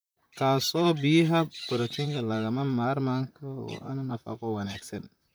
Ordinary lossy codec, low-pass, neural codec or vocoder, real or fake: none; none; vocoder, 44.1 kHz, 128 mel bands, Pupu-Vocoder; fake